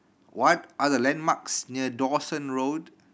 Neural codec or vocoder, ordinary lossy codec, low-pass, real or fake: none; none; none; real